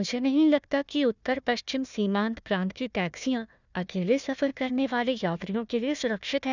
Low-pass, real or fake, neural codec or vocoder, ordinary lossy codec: 7.2 kHz; fake; codec, 16 kHz, 1 kbps, FunCodec, trained on Chinese and English, 50 frames a second; none